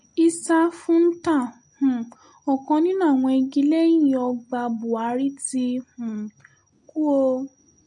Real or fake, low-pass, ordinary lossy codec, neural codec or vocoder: real; 9.9 kHz; MP3, 48 kbps; none